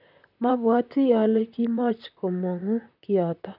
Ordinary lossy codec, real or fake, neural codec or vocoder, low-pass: none; fake; vocoder, 44.1 kHz, 128 mel bands, Pupu-Vocoder; 5.4 kHz